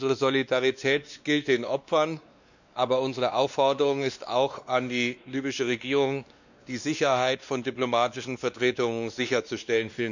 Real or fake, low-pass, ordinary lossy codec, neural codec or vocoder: fake; 7.2 kHz; none; codec, 16 kHz, 2 kbps, X-Codec, WavLM features, trained on Multilingual LibriSpeech